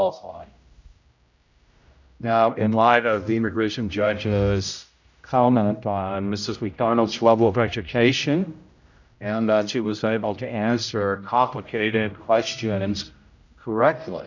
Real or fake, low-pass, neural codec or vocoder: fake; 7.2 kHz; codec, 16 kHz, 0.5 kbps, X-Codec, HuBERT features, trained on general audio